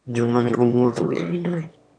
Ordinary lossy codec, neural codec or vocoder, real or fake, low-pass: none; autoencoder, 22.05 kHz, a latent of 192 numbers a frame, VITS, trained on one speaker; fake; 9.9 kHz